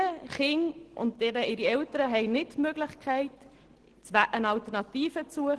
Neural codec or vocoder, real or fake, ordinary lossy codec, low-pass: none; real; Opus, 16 kbps; 10.8 kHz